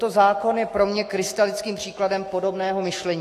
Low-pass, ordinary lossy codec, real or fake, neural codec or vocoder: 14.4 kHz; AAC, 48 kbps; fake; autoencoder, 48 kHz, 128 numbers a frame, DAC-VAE, trained on Japanese speech